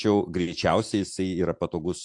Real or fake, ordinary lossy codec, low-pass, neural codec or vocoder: real; AAC, 64 kbps; 10.8 kHz; none